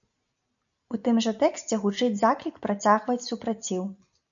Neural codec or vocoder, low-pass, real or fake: none; 7.2 kHz; real